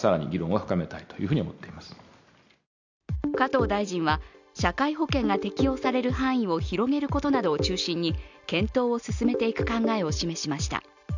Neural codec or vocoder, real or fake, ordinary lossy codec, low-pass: none; real; none; 7.2 kHz